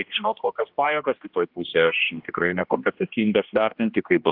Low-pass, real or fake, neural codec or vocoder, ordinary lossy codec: 5.4 kHz; fake; codec, 16 kHz, 1 kbps, X-Codec, HuBERT features, trained on general audio; Opus, 32 kbps